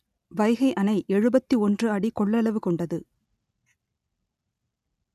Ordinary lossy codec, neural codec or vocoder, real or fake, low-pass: none; none; real; 14.4 kHz